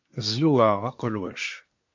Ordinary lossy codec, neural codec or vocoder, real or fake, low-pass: MP3, 48 kbps; codec, 24 kHz, 1 kbps, SNAC; fake; 7.2 kHz